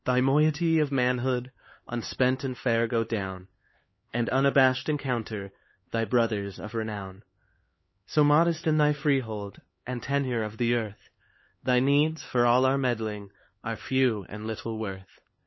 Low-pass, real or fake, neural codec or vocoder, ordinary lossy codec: 7.2 kHz; fake; codec, 16 kHz, 4 kbps, X-Codec, HuBERT features, trained on LibriSpeech; MP3, 24 kbps